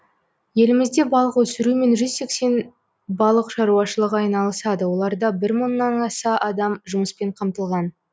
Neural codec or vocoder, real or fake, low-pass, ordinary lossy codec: none; real; none; none